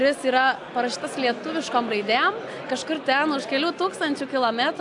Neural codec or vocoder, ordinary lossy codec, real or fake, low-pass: none; AAC, 64 kbps; real; 10.8 kHz